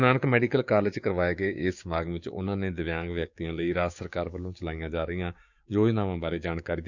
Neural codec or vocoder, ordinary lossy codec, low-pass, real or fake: codec, 16 kHz, 6 kbps, DAC; none; 7.2 kHz; fake